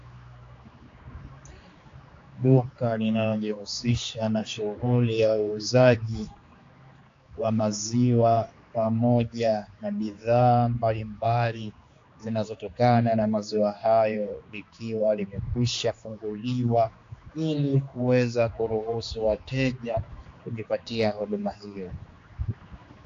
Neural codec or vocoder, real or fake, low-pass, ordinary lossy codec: codec, 16 kHz, 2 kbps, X-Codec, HuBERT features, trained on general audio; fake; 7.2 kHz; AAC, 48 kbps